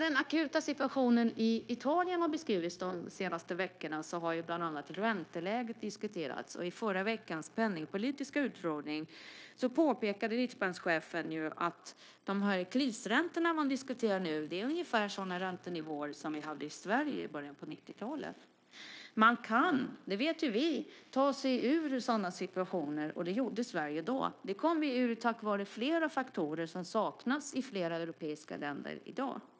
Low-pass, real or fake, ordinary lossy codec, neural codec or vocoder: none; fake; none; codec, 16 kHz, 0.9 kbps, LongCat-Audio-Codec